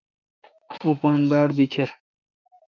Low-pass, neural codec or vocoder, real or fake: 7.2 kHz; autoencoder, 48 kHz, 32 numbers a frame, DAC-VAE, trained on Japanese speech; fake